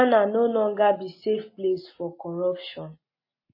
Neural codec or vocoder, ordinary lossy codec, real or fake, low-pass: none; MP3, 24 kbps; real; 5.4 kHz